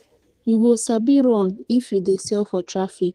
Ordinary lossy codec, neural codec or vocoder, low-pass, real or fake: Opus, 24 kbps; codec, 32 kHz, 1.9 kbps, SNAC; 14.4 kHz; fake